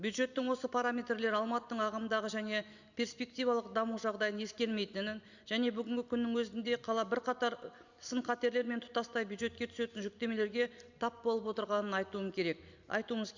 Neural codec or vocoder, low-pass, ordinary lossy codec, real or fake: none; 7.2 kHz; Opus, 64 kbps; real